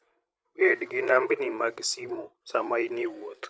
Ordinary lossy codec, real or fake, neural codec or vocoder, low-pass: none; fake; codec, 16 kHz, 8 kbps, FreqCodec, larger model; none